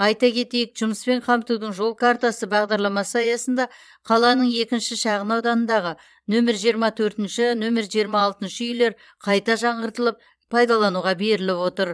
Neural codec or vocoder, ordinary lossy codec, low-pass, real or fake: vocoder, 22.05 kHz, 80 mel bands, Vocos; none; none; fake